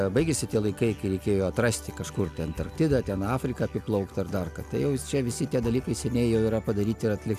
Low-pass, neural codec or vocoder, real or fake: 14.4 kHz; none; real